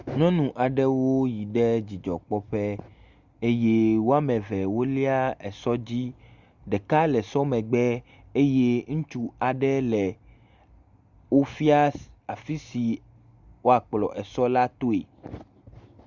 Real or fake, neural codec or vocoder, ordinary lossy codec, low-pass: real; none; Opus, 64 kbps; 7.2 kHz